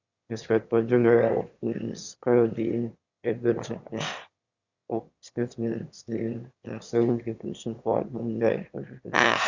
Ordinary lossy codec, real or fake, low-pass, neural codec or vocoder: Opus, 64 kbps; fake; 7.2 kHz; autoencoder, 22.05 kHz, a latent of 192 numbers a frame, VITS, trained on one speaker